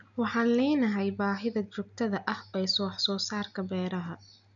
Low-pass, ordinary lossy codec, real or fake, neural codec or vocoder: 7.2 kHz; none; real; none